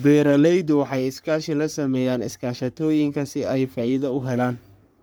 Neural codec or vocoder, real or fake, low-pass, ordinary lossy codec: codec, 44.1 kHz, 3.4 kbps, Pupu-Codec; fake; none; none